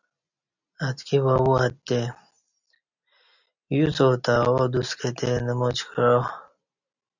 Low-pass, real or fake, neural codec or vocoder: 7.2 kHz; real; none